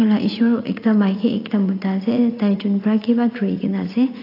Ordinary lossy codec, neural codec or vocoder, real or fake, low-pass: AAC, 32 kbps; vocoder, 44.1 kHz, 80 mel bands, Vocos; fake; 5.4 kHz